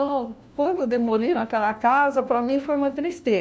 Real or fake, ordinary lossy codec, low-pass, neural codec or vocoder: fake; none; none; codec, 16 kHz, 1 kbps, FunCodec, trained on LibriTTS, 50 frames a second